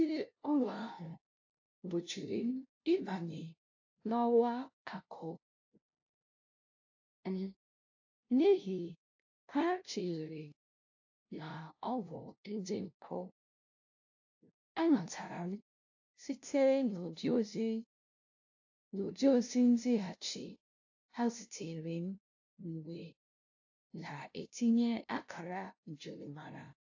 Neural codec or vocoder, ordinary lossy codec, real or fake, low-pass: codec, 16 kHz, 0.5 kbps, FunCodec, trained on LibriTTS, 25 frames a second; AAC, 48 kbps; fake; 7.2 kHz